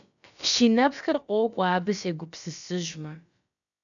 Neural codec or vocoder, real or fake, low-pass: codec, 16 kHz, about 1 kbps, DyCAST, with the encoder's durations; fake; 7.2 kHz